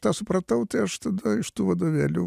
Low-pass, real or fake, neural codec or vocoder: 14.4 kHz; real; none